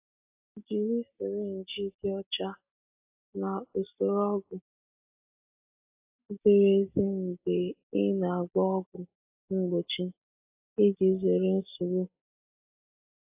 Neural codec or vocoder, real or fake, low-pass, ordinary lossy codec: none; real; 3.6 kHz; AAC, 32 kbps